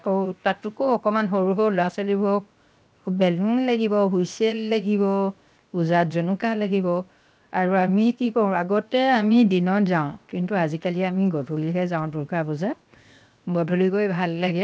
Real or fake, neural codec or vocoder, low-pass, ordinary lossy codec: fake; codec, 16 kHz, 0.7 kbps, FocalCodec; none; none